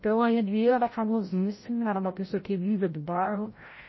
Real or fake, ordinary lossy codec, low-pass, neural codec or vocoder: fake; MP3, 24 kbps; 7.2 kHz; codec, 16 kHz, 0.5 kbps, FreqCodec, larger model